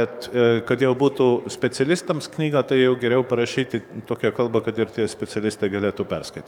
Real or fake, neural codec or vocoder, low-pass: fake; codec, 44.1 kHz, 7.8 kbps, DAC; 19.8 kHz